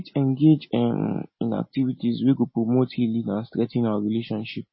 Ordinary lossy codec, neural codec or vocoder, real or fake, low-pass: MP3, 24 kbps; none; real; 7.2 kHz